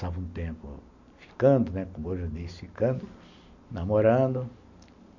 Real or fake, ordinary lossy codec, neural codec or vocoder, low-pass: real; none; none; 7.2 kHz